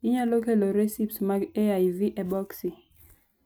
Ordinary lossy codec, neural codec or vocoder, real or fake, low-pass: none; none; real; none